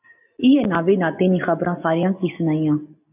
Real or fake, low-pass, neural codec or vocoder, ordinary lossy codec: real; 3.6 kHz; none; AAC, 32 kbps